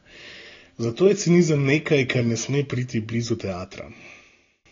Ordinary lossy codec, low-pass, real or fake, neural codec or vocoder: AAC, 32 kbps; 7.2 kHz; real; none